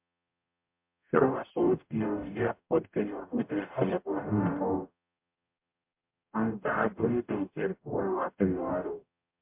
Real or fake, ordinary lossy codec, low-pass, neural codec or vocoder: fake; MP3, 32 kbps; 3.6 kHz; codec, 44.1 kHz, 0.9 kbps, DAC